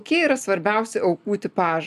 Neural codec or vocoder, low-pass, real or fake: none; 14.4 kHz; real